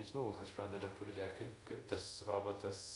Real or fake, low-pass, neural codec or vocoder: fake; 10.8 kHz; codec, 24 kHz, 0.5 kbps, DualCodec